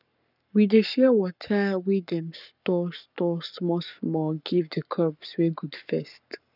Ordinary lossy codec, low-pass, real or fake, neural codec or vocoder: none; 5.4 kHz; fake; codec, 44.1 kHz, 7.8 kbps, Pupu-Codec